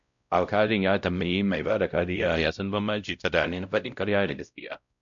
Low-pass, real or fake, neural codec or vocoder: 7.2 kHz; fake; codec, 16 kHz, 0.5 kbps, X-Codec, WavLM features, trained on Multilingual LibriSpeech